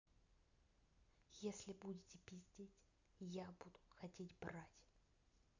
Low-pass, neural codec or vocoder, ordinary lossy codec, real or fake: 7.2 kHz; none; none; real